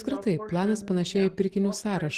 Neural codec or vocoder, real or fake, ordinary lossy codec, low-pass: none; real; Opus, 16 kbps; 14.4 kHz